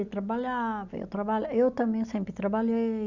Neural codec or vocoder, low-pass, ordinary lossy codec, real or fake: none; 7.2 kHz; none; real